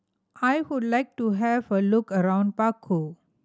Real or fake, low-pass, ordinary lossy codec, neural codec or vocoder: real; none; none; none